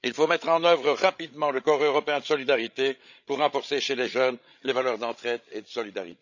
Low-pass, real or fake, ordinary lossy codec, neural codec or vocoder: 7.2 kHz; fake; none; codec, 16 kHz, 16 kbps, FreqCodec, larger model